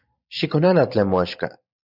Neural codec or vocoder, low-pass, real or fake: vocoder, 44.1 kHz, 128 mel bands every 512 samples, BigVGAN v2; 5.4 kHz; fake